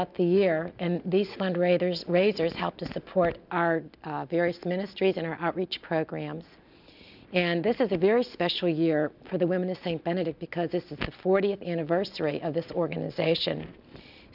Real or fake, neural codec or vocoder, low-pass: real; none; 5.4 kHz